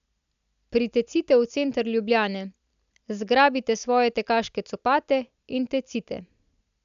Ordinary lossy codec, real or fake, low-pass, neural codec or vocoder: AAC, 96 kbps; real; 7.2 kHz; none